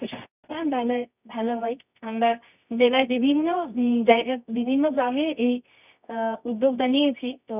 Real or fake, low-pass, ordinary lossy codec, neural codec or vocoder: fake; 3.6 kHz; none; codec, 24 kHz, 0.9 kbps, WavTokenizer, medium music audio release